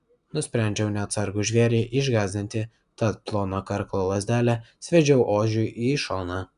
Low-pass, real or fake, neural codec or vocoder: 10.8 kHz; real; none